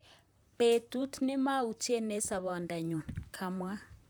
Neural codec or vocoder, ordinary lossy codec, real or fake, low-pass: vocoder, 44.1 kHz, 128 mel bands, Pupu-Vocoder; none; fake; none